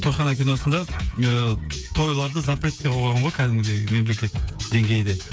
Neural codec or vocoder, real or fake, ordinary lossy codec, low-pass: codec, 16 kHz, 8 kbps, FreqCodec, smaller model; fake; none; none